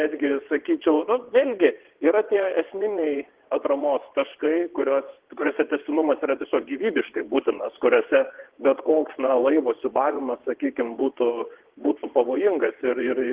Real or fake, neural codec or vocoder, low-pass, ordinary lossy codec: fake; vocoder, 22.05 kHz, 80 mel bands, WaveNeXt; 3.6 kHz; Opus, 16 kbps